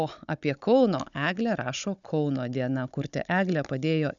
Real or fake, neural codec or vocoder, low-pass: fake; codec, 16 kHz, 16 kbps, FunCodec, trained on Chinese and English, 50 frames a second; 7.2 kHz